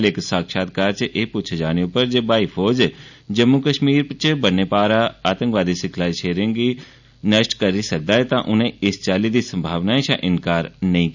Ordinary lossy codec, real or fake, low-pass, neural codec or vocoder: none; real; 7.2 kHz; none